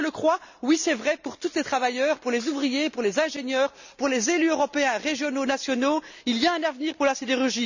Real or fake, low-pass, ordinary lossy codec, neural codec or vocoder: real; 7.2 kHz; none; none